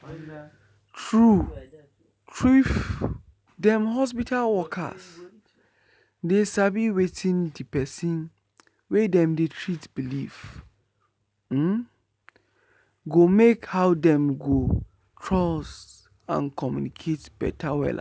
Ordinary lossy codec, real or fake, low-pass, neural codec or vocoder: none; real; none; none